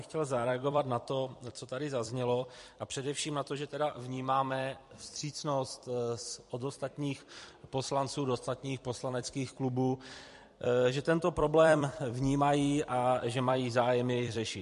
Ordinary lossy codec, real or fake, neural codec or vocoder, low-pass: MP3, 48 kbps; fake; vocoder, 44.1 kHz, 128 mel bands every 256 samples, BigVGAN v2; 14.4 kHz